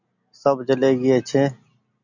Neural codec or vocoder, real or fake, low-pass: none; real; 7.2 kHz